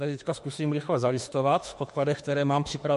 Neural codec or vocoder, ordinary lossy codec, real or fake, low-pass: autoencoder, 48 kHz, 32 numbers a frame, DAC-VAE, trained on Japanese speech; MP3, 48 kbps; fake; 14.4 kHz